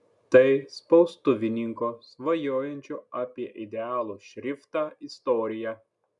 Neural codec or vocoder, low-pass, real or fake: none; 10.8 kHz; real